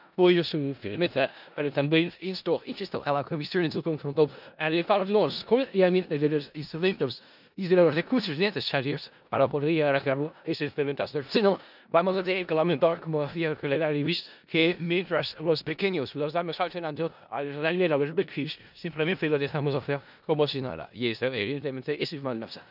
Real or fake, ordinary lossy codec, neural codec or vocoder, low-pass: fake; none; codec, 16 kHz in and 24 kHz out, 0.4 kbps, LongCat-Audio-Codec, four codebook decoder; 5.4 kHz